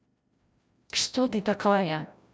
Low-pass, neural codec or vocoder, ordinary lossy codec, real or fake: none; codec, 16 kHz, 0.5 kbps, FreqCodec, larger model; none; fake